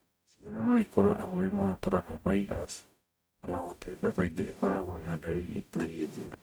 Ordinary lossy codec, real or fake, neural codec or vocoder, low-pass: none; fake; codec, 44.1 kHz, 0.9 kbps, DAC; none